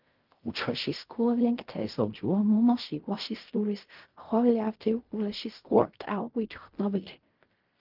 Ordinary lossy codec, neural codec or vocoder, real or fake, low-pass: Opus, 32 kbps; codec, 16 kHz in and 24 kHz out, 0.4 kbps, LongCat-Audio-Codec, fine tuned four codebook decoder; fake; 5.4 kHz